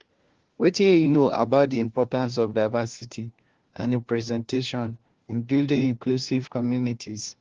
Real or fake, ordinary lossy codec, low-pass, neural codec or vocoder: fake; Opus, 16 kbps; 7.2 kHz; codec, 16 kHz, 1 kbps, FunCodec, trained on LibriTTS, 50 frames a second